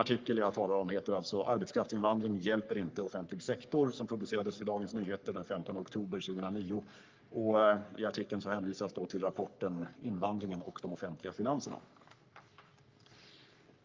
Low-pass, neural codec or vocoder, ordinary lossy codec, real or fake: 7.2 kHz; codec, 44.1 kHz, 3.4 kbps, Pupu-Codec; Opus, 24 kbps; fake